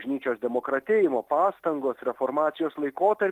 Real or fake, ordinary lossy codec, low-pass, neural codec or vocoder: real; Opus, 24 kbps; 14.4 kHz; none